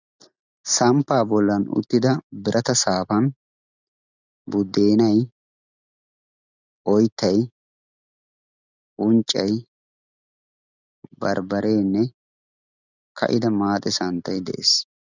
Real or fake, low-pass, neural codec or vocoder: real; 7.2 kHz; none